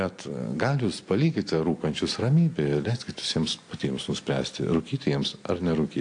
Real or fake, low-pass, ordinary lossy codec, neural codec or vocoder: real; 9.9 kHz; AAC, 48 kbps; none